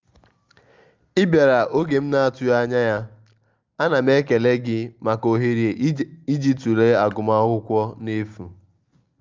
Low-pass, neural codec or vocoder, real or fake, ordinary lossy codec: 7.2 kHz; none; real; Opus, 24 kbps